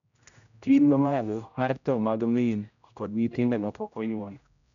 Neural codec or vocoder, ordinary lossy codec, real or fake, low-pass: codec, 16 kHz, 0.5 kbps, X-Codec, HuBERT features, trained on general audio; MP3, 96 kbps; fake; 7.2 kHz